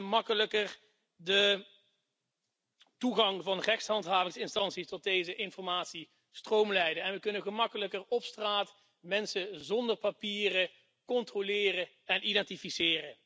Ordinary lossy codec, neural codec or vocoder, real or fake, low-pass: none; none; real; none